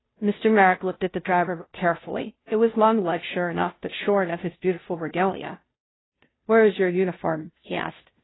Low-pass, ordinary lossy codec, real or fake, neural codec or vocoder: 7.2 kHz; AAC, 16 kbps; fake; codec, 16 kHz, 0.5 kbps, FunCodec, trained on Chinese and English, 25 frames a second